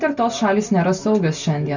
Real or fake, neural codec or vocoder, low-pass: real; none; 7.2 kHz